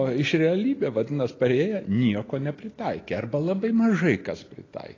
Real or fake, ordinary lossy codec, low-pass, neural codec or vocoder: real; AAC, 32 kbps; 7.2 kHz; none